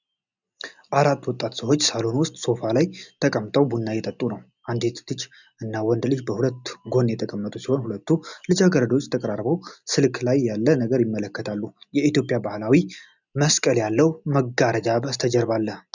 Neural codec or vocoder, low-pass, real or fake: none; 7.2 kHz; real